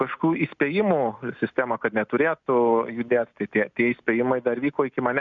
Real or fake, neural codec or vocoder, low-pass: real; none; 7.2 kHz